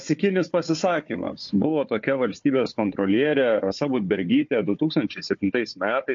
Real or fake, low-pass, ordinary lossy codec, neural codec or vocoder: fake; 7.2 kHz; MP3, 48 kbps; codec, 16 kHz, 4 kbps, FunCodec, trained on Chinese and English, 50 frames a second